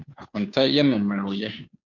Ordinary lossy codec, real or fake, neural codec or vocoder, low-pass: MP3, 64 kbps; fake; codec, 16 kHz, 2 kbps, FunCodec, trained on Chinese and English, 25 frames a second; 7.2 kHz